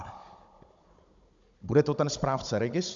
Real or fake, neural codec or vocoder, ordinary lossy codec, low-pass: fake; codec, 16 kHz, 16 kbps, FunCodec, trained on Chinese and English, 50 frames a second; MP3, 64 kbps; 7.2 kHz